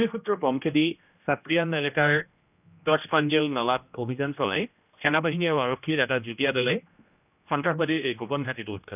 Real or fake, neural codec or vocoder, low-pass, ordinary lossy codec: fake; codec, 16 kHz, 1 kbps, X-Codec, HuBERT features, trained on general audio; 3.6 kHz; none